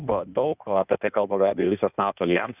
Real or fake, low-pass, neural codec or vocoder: fake; 3.6 kHz; codec, 16 kHz in and 24 kHz out, 1.1 kbps, FireRedTTS-2 codec